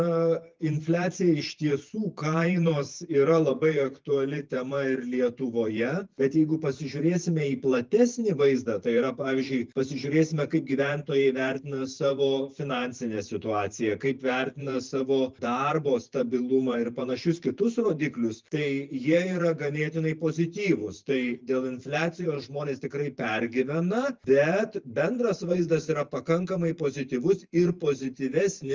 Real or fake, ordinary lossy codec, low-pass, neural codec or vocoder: fake; Opus, 32 kbps; 7.2 kHz; vocoder, 44.1 kHz, 128 mel bands every 512 samples, BigVGAN v2